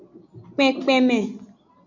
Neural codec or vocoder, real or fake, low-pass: none; real; 7.2 kHz